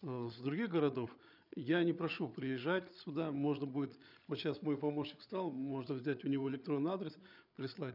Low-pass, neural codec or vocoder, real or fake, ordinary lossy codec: 5.4 kHz; codec, 16 kHz, 8 kbps, FreqCodec, larger model; fake; none